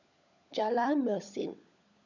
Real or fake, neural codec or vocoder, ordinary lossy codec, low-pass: fake; codec, 16 kHz, 16 kbps, FunCodec, trained on LibriTTS, 50 frames a second; none; 7.2 kHz